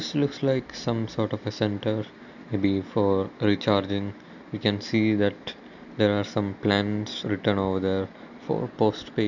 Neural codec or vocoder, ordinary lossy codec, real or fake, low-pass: none; none; real; 7.2 kHz